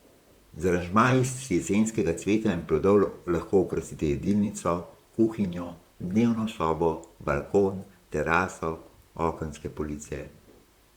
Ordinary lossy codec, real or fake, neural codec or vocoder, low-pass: Opus, 64 kbps; fake; vocoder, 44.1 kHz, 128 mel bands, Pupu-Vocoder; 19.8 kHz